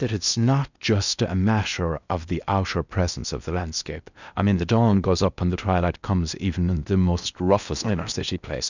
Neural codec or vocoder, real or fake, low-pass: codec, 16 kHz in and 24 kHz out, 0.8 kbps, FocalCodec, streaming, 65536 codes; fake; 7.2 kHz